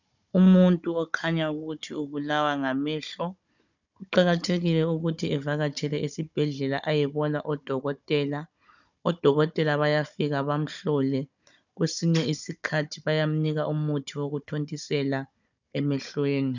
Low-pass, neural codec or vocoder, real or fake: 7.2 kHz; codec, 16 kHz, 16 kbps, FunCodec, trained on Chinese and English, 50 frames a second; fake